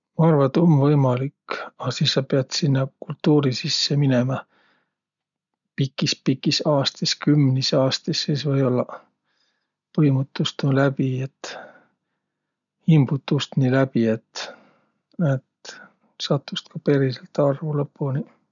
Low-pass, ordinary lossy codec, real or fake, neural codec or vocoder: 7.2 kHz; none; real; none